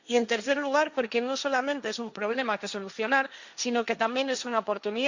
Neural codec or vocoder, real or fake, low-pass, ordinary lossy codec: codec, 16 kHz, 1.1 kbps, Voila-Tokenizer; fake; 7.2 kHz; Opus, 64 kbps